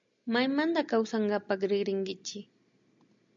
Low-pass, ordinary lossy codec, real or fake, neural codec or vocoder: 7.2 kHz; MP3, 64 kbps; real; none